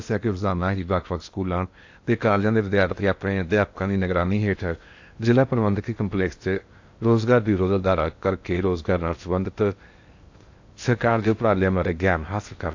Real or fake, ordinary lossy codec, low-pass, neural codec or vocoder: fake; AAC, 48 kbps; 7.2 kHz; codec, 16 kHz in and 24 kHz out, 0.8 kbps, FocalCodec, streaming, 65536 codes